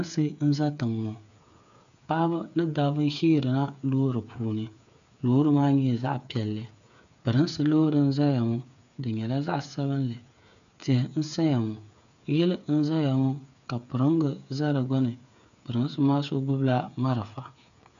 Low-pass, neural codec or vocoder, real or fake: 7.2 kHz; codec, 16 kHz, 8 kbps, FreqCodec, smaller model; fake